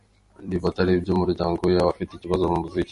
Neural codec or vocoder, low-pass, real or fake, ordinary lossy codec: vocoder, 44.1 kHz, 128 mel bands every 256 samples, BigVGAN v2; 14.4 kHz; fake; MP3, 48 kbps